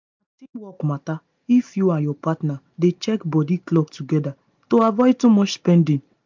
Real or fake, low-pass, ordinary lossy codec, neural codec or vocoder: real; 7.2 kHz; MP3, 64 kbps; none